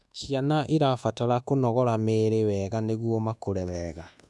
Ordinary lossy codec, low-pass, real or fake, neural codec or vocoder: none; none; fake; codec, 24 kHz, 1.2 kbps, DualCodec